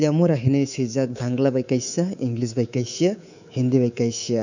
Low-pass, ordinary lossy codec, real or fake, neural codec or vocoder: 7.2 kHz; none; fake; codec, 24 kHz, 3.1 kbps, DualCodec